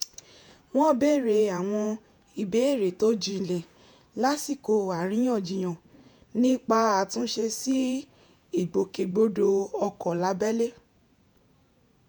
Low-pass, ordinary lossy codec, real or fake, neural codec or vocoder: none; none; fake; vocoder, 48 kHz, 128 mel bands, Vocos